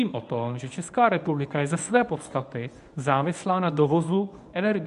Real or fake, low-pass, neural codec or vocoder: fake; 10.8 kHz; codec, 24 kHz, 0.9 kbps, WavTokenizer, medium speech release version 1